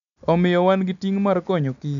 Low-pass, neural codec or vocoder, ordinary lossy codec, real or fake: 7.2 kHz; none; none; real